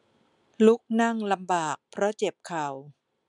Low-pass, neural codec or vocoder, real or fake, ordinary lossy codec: 10.8 kHz; none; real; none